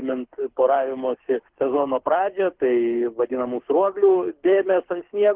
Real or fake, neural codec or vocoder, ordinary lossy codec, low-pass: fake; codec, 24 kHz, 6 kbps, HILCodec; Opus, 16 kbps; 3.6 kHz